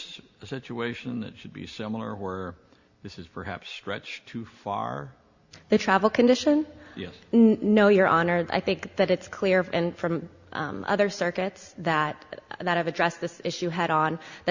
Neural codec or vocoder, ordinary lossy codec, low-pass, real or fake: none; Opus, 64 kbps; 7.2 kHz; real